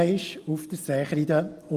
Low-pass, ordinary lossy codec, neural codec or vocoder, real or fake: 14.4 kHz; Opus, 24 kbps; none; real